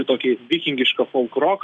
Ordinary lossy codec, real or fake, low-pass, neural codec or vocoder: Opus, 64 kbps; real; 9.9 kHz; none